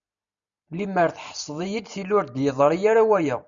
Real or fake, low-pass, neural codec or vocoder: real; 7.2 kHz; none